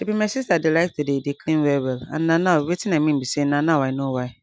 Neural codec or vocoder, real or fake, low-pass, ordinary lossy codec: none; real; none; none